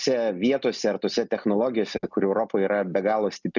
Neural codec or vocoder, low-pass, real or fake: none; 7.2 kHz; real